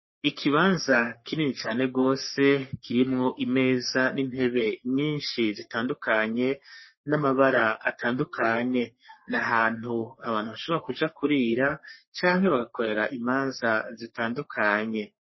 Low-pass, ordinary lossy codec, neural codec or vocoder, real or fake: 7.2 kHz; MP3, 24 kbps; codec, 44.1 kHz, 3.4 kbps, Pupu-Codec; fake